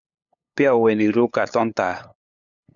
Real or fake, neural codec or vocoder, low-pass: fake; codec, 16 kHz, 8 kbps, FunCodec, trained on LibriTTS, 25 frames a second; 7.2 kHz